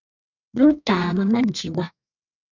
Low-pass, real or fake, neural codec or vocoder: 7.2 kHz; fake; codec, 32 kHz, 1.9 kbps, SNAC